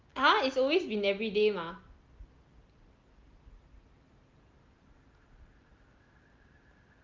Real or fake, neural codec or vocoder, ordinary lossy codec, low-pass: real; none; Opus, 32 kbps; 7.2 kHz